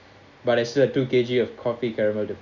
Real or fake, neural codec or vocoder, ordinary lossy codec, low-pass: real; none; none; 7.2 kHz